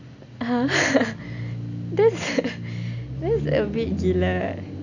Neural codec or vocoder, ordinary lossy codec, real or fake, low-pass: none; none; real; 7.2 kHz